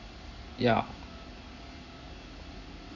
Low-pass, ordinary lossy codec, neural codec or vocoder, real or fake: 7.2 kHz; none; none; real